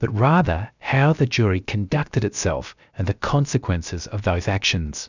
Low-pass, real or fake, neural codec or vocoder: 7.2 kHz; fake; codec, 16 kHz, 0.7 kbps, FocalCodec